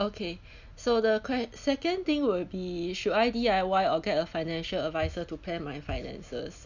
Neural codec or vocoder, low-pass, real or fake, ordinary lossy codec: none; 7.2 kHz; real; none